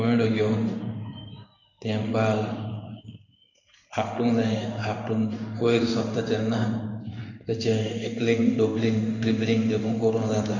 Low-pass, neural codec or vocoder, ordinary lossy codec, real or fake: 7.2 kHz; codec, 16 kHz in and 24 kHz out, 1 kbps, XY-Tokenizer; none; fake